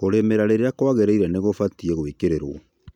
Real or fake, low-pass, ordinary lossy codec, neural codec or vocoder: real; 19.8 kHz; none; none